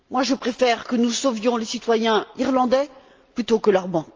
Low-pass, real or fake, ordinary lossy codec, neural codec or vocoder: 7.2 kHz; real; Opus, 24 kbps; none